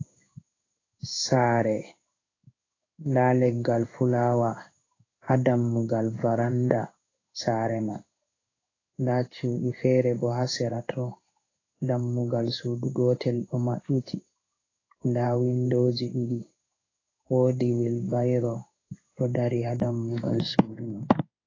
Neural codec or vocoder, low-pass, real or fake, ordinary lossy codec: codec, 16 kHz in and 24 kHz out, 1 kbps, XY-Tokenizer; 7.2 kHz; fake; AAC, 32 kbps